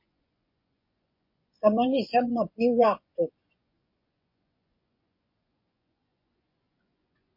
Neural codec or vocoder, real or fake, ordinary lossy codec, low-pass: vocoder, 24 kHz, 100 mel bands, Vocos; fake; MP3, 32 kbps; 5.4 kHz